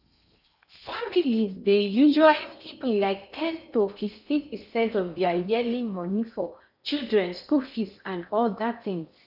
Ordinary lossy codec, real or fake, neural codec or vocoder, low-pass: none; fake; codec, 16 kHz in and 24 kHz out, 0.6 kbps, FocalCodec, streaming, 2048 codes; 5.4 kHz